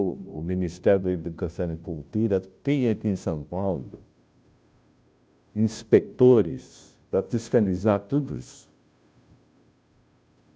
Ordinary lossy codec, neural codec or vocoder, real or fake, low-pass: none; codec, 16 kHz, 0.5 kbps, FunCodec, trained on Chinese and English, 25 frames a second; fake; none